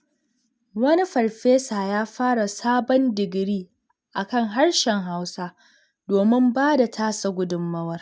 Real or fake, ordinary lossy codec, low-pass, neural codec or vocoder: real; none; none; none